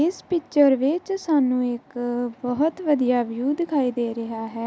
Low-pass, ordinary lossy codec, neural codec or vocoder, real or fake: none; none; none; real